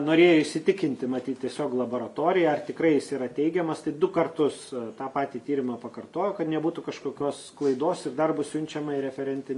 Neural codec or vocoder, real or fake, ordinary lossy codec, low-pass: none; real; MP3, 48 kbps; 14.4 kHz